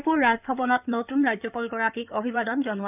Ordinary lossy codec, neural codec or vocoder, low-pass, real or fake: none; codec, 16 kHz in and 24 kHz out, 2.2 kbps, FireRedTTS-2 codec; 3.6 kHz; fake